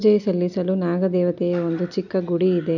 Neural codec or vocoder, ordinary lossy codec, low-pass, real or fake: none; none; 7.2 kHz; real